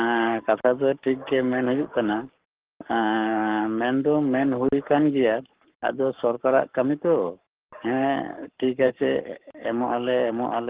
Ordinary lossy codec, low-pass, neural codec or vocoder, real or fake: Opus, 16 kbps; 3.6 kHz; codec, 44.1 kHz, 7.8 kbps, Pupu-Codec; fake